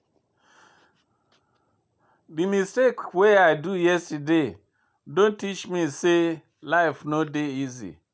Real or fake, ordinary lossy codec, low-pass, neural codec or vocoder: real; none; none; none